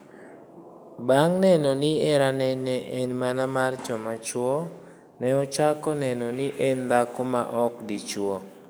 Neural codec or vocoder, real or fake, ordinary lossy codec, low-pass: codec, 44.1 kHz, 7.8 kbps, DAC; fake; none; none